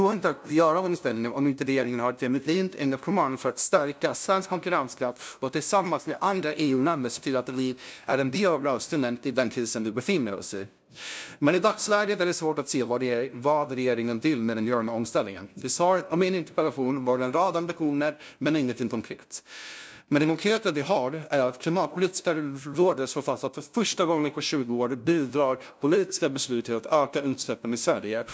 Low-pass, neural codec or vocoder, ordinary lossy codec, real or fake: none; codec, 16 kHz, 0.5 kbps, FunCodec, trained on LibriTTS, 25 frames a second; none; fake